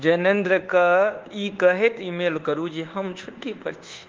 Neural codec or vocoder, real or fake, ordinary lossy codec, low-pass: codec, 24 kHz, 1.2 kbps, DualCodec; fake; Opus, 16 kbps; 7.2 kHz